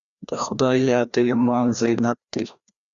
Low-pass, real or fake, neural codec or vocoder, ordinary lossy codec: 7.2 kHz; fake; codec, 16 kHz, 1 kbps, FreqCodec, larger model; Opus, 64 kbps